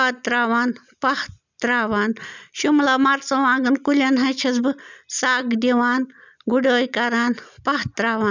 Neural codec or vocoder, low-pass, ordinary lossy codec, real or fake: none; 7.2 kHz; none; real